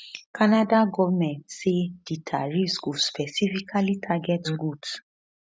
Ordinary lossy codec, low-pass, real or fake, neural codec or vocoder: none; none; real; none